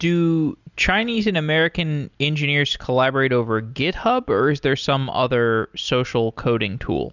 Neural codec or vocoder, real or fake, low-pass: none; real; 7.2 kHz